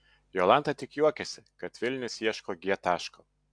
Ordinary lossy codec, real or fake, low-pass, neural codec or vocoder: MP3, 64 kbps; real; 9.9 kHz; none